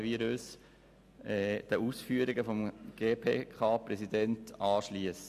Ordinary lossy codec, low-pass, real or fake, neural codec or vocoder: AAC, 96 kbps; 14.4 kHz; real; none